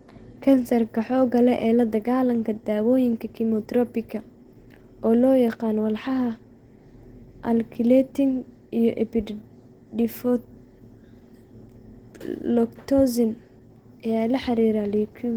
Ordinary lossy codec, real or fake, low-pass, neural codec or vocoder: Opus, 16 kbps; real; 19.8 kHz; none